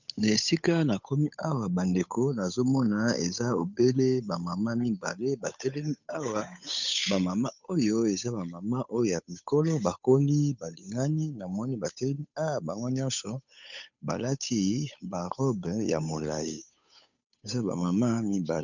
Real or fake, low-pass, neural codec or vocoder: fake; 7.2 kHz; codec, 16 kHz, 8 kbps, FunCodec, trained on Chinese and English, 25 frames a second